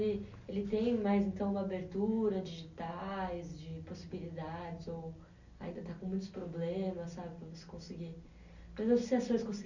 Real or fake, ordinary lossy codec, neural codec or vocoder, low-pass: real; none; none; 7.2 kHz